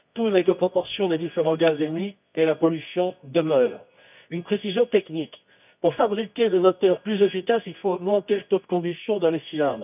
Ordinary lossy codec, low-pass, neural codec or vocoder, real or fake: none; 3.6 kHz; codec, 24 kHz, 0.9 kbps, WavTokenizer, medium music audio release; fake